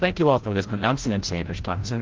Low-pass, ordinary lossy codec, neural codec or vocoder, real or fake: 7.2 kHz; Opus, 16 kbps; codec, 16 kHz, 0.5 kbps, FreqCodec, larger model; fake